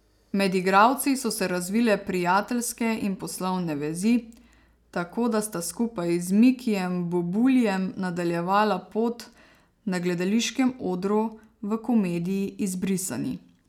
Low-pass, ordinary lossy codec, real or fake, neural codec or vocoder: 19.8 kHz; none; real; none